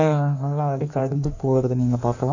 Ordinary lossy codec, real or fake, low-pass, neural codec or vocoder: none; fake; 7.2 kHz; codec, 16 kHz in and 24 kHz out, 1.1 kbps, FireRedTTS-2 codec